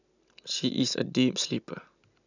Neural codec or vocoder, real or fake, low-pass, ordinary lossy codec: none; real; 7.2 kHz; none